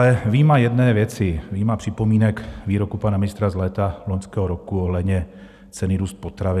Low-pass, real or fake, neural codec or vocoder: 14.4 kHz; real; none